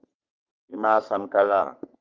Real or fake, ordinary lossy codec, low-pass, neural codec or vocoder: fake; Opus, 24 kbps; 7.2 kHz; codec, 44.1 kHz, 3.4 kbps, Pupu-Codec